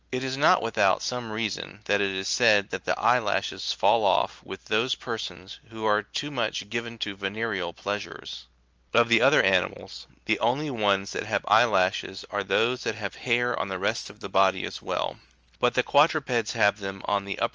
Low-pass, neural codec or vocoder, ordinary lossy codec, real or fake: 7.2 kHz; none; Opus, 24 kbps; real